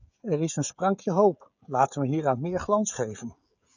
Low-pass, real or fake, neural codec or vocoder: 7.2 kHz; fake; codec, 16 kHz, 16 kbps, FreqCodec, smaller model